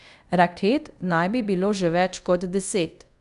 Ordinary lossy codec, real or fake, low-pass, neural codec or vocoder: none; fake; 10.8 kHz; codec, 24 kHz, 0.5 kbps, DualCodec